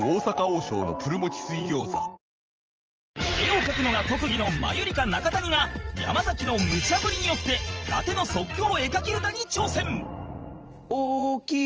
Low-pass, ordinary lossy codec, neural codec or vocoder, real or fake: 7.2 kHz; Opus, 24 kbps; vocoder, 44.1 kHz, 80 mel bands, Vocos; fake